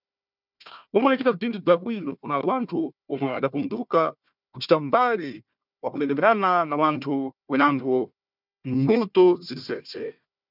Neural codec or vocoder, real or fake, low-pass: codec, 16 kHz, 1 kbps, FunCodec, trained on Chinese and English, 50 frames a second; fake; 5.4 kHz